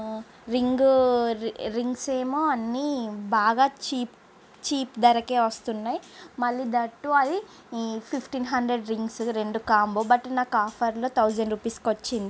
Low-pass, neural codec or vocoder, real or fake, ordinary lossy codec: none; none; real; none